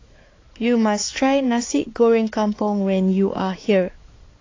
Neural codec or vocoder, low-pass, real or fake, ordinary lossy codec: codec, 16 kHz, 4 kbps, X-Codec, HuBERT features, trained on balanced general audio; 7.2 kHz; fake; AAC, 32 kbps